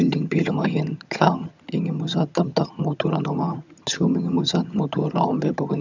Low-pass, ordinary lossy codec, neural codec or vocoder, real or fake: 7.2 kHz; none; vocoder, 22.05 kHz, 80 mel bands, HiFi-GAN; fake